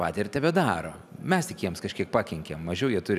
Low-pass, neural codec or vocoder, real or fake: 14.4 kHz; none; real